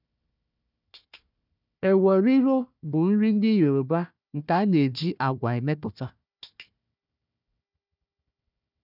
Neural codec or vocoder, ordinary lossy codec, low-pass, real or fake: codec, 16 kHz, 1 kbps, FunCodec, trained on Chinese and English, 50 frames a second; none; 5.4 kHz; fake